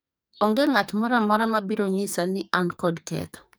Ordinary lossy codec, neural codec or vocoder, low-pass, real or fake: none; codec, 44.1 kHz, 2.6 kbps, SNAC; none; fake